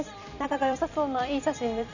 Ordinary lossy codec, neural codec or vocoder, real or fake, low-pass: none; vocoder, 44.1 kHz, 128 mel bands every 256 samples, BigVGAN v2; fake; 7.2 kHz